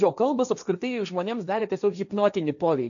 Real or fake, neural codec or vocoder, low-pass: fake; codec, 16 kHz, 1.1 kbps, Voila-Tokenizer; 7.2 kHz